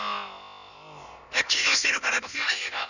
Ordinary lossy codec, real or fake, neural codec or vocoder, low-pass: none; fake; codec, 16 kHz, about 1 kbps, DyCAST, with the encoder's durations; 7.2 kHz